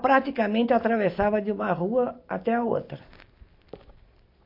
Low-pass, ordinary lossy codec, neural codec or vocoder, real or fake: 5.4 kHz; MP3, 32 kbps; none; real